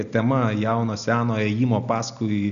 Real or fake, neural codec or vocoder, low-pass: real; none; 7.2 kHz